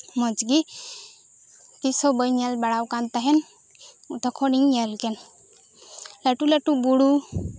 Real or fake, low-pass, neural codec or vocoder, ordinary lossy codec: real; none; none; none